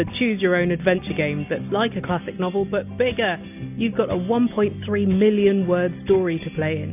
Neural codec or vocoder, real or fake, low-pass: none; real; 3.6 kHz